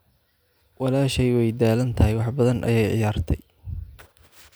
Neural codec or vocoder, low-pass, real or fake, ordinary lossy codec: none; none; real; none